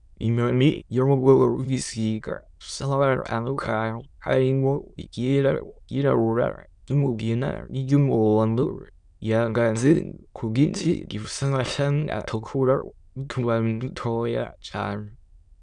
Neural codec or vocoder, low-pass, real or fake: autoencoder, 22.05 kHz, a latent of 192 numbers a frame, VITS, trained on many speakers; 9.9 kHz; fake